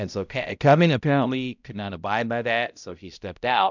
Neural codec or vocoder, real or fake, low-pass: codec, 16 kHz, 0.5 kbps, X-Codec, HuBERT features, trained on balanced general audio; fake; 7.2 kHz